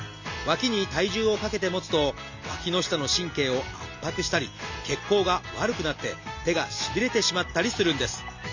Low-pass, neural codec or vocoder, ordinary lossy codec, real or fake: 7.2 kHz; none; Opus, 64 kbps; real